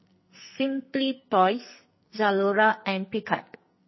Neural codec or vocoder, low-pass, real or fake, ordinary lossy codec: codec, 44.1 kHz, 2.6 kbps, SNAC; 7.2 kHz; fake; MP3, 24 kbps